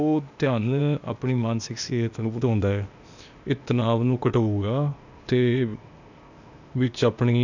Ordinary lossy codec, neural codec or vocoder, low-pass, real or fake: none; codec, 16 kHz, 0.8 kbps, ZipCodec; 7.2 kHz; fake